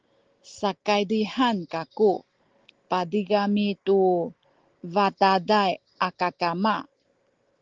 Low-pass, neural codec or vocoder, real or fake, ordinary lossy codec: 7.2 kHz; none; real; Opus, 16 kbps